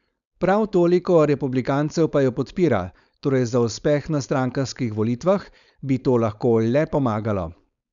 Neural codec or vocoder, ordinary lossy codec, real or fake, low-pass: codec, 16 kHz, 4.8 kbps, FACodec; none; fake; 7.2 kHz